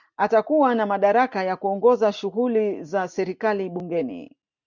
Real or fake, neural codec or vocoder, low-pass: real; none; 7.2 kHz